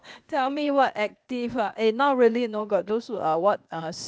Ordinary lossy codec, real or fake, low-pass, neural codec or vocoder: none; fake; none; codec, 16 kHz, 0.8 kbps, ZipCodec